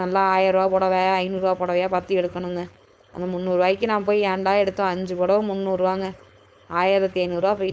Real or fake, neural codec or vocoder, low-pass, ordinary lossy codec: fake; codec, 16 kHz, 4.8 kbps, FACodec; none; none